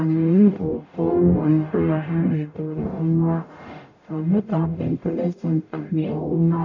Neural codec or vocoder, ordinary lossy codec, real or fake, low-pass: codec, 44.1 kHz, 0.9 kbps, DAC; none; fake; 7.2 kHz